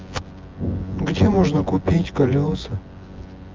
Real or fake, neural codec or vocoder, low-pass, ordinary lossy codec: fake; vocoder, 24 kHz, 100 mel bands, Vocos; 7.2 kHz; Opus, 32 kbps